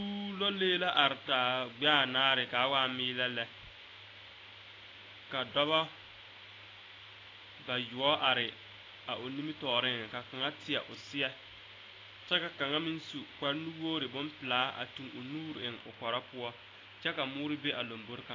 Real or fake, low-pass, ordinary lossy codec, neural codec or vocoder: real; 7.2 kHz; AAC, 32 kbps; none